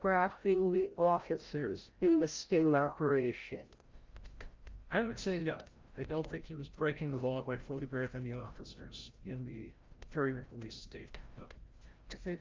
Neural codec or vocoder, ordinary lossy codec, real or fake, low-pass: codec, 16 kHz, 0.5 kbps, FreqCodec, larger model; Opus, 32 kbps; fake; 7.2 kHz